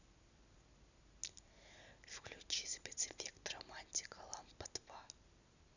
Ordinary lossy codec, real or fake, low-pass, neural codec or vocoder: none; real; 7.2 kHz; none